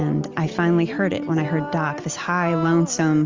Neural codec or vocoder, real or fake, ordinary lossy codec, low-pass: none; real; Opus, 32 kbps; 7.2 kHz